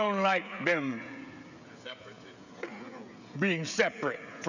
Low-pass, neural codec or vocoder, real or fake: 7.2 kHz; codec, 16 kHz, 16 kbps, FreqCodec, smaller model; fake